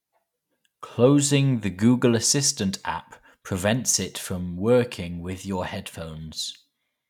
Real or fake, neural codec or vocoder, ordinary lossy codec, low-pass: real; none; none; 19.8 kHz